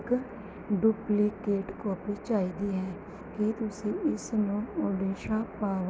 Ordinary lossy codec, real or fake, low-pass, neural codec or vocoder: none; real; none; none